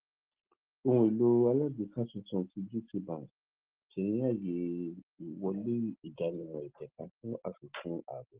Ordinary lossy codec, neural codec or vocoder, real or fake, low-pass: Opus, 16 kbps; none; real; 3.6 kHz